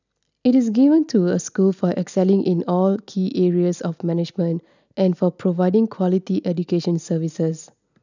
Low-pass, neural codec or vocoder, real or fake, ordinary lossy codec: 7.2 kHz; none; real; none